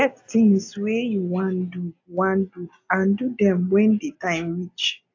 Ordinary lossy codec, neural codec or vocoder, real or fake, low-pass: AAC, 48 kbps; none; real; 7.2 kHz